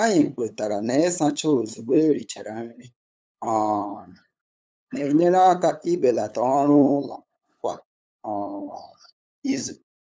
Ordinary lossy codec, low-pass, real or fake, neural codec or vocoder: none; none; fake; codec, 16 kHz, 8 kbps, FunCodec, trained on LibriTTS, 25 frames a second